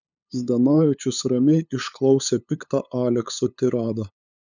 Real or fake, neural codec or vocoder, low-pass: fake; codec, 16 kHz, 8 kbps, FunCodec, trained on LibriTTS, 25 frames a second; 7.2 kHz